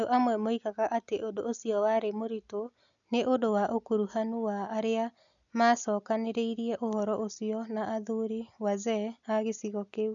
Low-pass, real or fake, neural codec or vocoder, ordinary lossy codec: 7.2 kHz; real; none; none